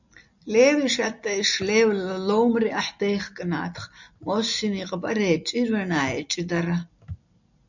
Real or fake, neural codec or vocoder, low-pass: real; none; 7.2 kHz